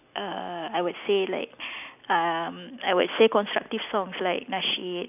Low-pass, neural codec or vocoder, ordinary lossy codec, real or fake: 3.6 kHz; none; none; real